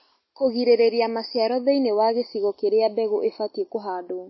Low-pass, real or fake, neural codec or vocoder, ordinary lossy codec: 7.2 kHz; real; none; MP3, 24 kbps